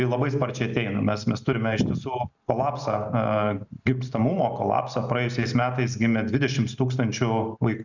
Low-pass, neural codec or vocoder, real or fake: 7.2 kHz; none; real